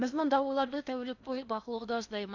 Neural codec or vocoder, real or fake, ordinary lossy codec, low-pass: codec, 16 kHz in and 24 kHz out, 0.6 kbps, FocalCodec, streaming, 4096 codes; fake; none; 7.2 kHz